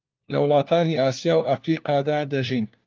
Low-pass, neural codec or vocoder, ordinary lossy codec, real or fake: 7.2 kHz; codec, 16 kHz, 1 kbps, FunCodec, trained on LibriTTS, 50 frames a second; Opus, 32 kbps; fake